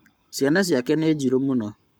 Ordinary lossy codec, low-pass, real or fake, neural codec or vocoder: none; none; fake; codec, 44.1 kHz, 7.8 kbps, Pupu-Codec